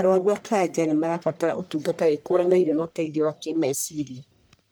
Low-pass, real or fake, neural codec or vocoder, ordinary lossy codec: none; fake; codec, 44.1 kHz, 1.7 kbps, Pupu-Codec; none